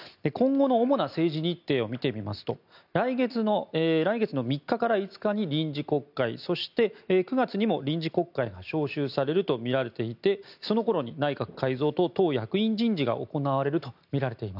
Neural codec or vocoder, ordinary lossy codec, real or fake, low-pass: none; none; real; 5.4 kHz